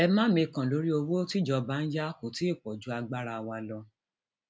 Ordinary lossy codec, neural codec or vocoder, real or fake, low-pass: none; none; real; none